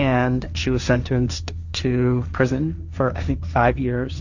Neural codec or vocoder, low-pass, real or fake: codec, 16 kHz, 1.1 kbps, Voila-Tokenizer; 7.2 kHz; fake